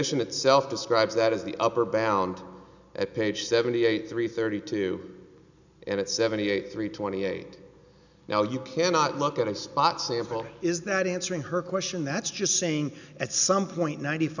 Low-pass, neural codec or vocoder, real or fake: 7.2 kHz; none; real